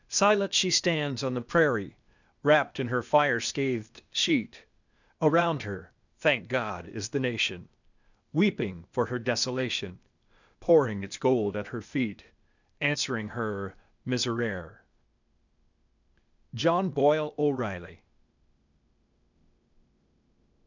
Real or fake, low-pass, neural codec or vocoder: fake; 7.2 kHz; codec, 16 kHz, 0.8 kbps, ZipCodec